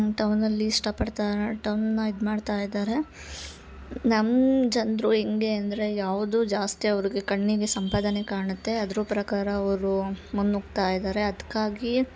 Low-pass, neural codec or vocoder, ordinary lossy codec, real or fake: none; none; none; real